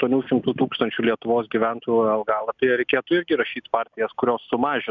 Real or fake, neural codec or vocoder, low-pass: real; none; 7.2 kHz